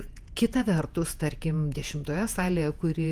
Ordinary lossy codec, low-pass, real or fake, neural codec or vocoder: Opus, 32 kbps; 14.4 kHz; fake; vocoder, 48 kHz, 128 mel bands, Vocos